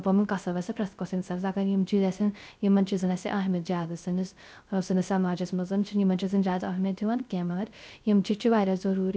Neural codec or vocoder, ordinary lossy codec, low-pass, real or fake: codec, 16 kHz, 0.3 kbps, FocalCodec; none; none; fake